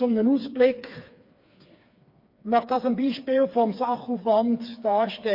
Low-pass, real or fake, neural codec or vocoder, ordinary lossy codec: 5.4 kHz; fake; codec, 16 kHz, 4 kbps, FreqCodec, smaller model; MP3, 32 kbps